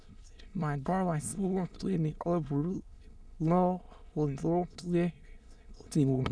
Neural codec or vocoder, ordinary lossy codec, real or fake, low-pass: autoencoder, 22.05 kHz, a latent of 192 numbers a frame, VITS, trained on many speakers; none; fake; none